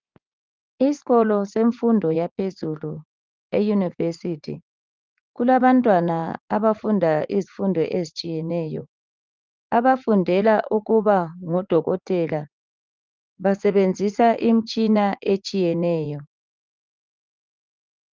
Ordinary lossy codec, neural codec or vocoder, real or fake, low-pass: Opus, 32 kbps; vocoder, 44.1 kHz, 80 mel bands, Vocos; fake; 7.2 kHz